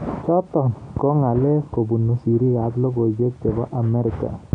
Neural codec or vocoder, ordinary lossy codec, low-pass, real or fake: none; none; 9.9 kHz; real